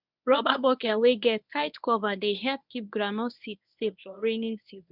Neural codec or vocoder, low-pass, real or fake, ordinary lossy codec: codec, 24 kHz, 0.9 kbps, WavTokenizer, medium speech release version 1; 5.4 kHz; fake; none